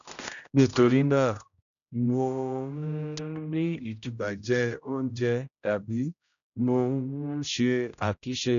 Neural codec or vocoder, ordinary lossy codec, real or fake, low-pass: codec, 16 kHz, 0.5 kbps, X-Codec, HuBERT features, trained on general audio; none; fake; 7.2 kHz